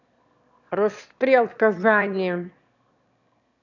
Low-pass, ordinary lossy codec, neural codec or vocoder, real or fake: 7.2 kHz; none; autoencoder, 22.05 kHz, a latent of 192 numbers a frame, VITS, trained on one speaker; fake